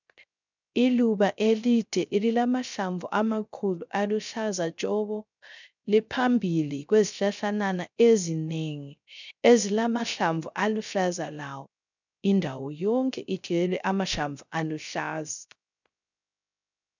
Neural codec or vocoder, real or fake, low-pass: codec, 16 kHz, 0.3 kbps, FocalCodec; fake; 7.2 kHz